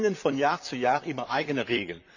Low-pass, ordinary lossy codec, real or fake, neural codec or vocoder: 7.2 kHz; none; fake; vocoder, 44.1 kHz, 128 mel bands, Pupu-Vocoder